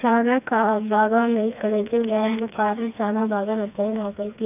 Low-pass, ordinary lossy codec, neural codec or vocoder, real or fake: 3.6 kHz; none; codec, 16 kHz, 2 kbps, FreqCodec, smaller model; fake